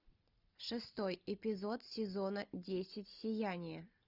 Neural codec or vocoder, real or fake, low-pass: none; real; 5.4 kHz